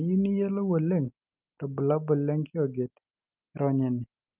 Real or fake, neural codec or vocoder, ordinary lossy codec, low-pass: real; none; Opus, 24 kbps; 3.6 kHz